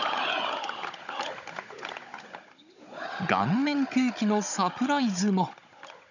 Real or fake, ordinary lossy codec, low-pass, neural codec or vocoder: fake; none; 7.2 kHz; codec, 16 kHz, 16 kbps, FunCodec, trained on Chinese and English, 50 frames a second